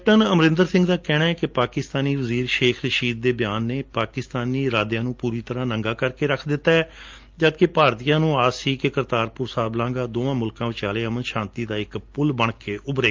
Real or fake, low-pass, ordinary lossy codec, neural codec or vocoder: real; 7.2 kHz; Opus, 24 kbps; none